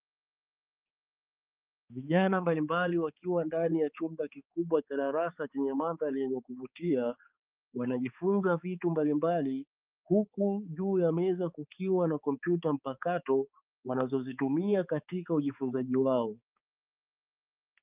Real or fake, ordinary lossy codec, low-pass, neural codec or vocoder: fake; Opus, 32 kbps; 3.6 kHz; codec, 16 kHz, 4 kbps, X-Codec, HuBERT features, trained on balanced general audio